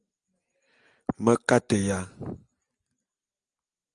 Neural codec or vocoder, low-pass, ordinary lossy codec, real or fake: none; 9.9 kHz; Opus, 32 kbps; real